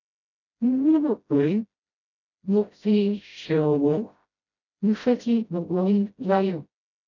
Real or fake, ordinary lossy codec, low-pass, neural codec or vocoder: fake; none; 7.2 kHz; codec, 16 kHz, 0.5 kbps, FreqCodec, smaller model